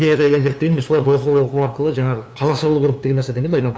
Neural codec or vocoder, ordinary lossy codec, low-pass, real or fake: codec, 16 kHz, 2 kbps, FunCodec, trained on LibriTTS, 25 frames a second; none; none; fake